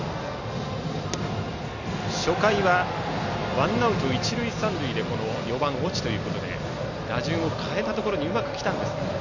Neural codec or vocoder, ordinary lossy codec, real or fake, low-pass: none; none; real; 7.2 kHz